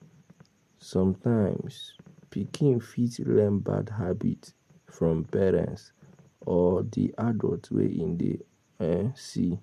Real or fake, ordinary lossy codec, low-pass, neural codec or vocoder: real; MP3, 64 kbps; 14.4 kHz; none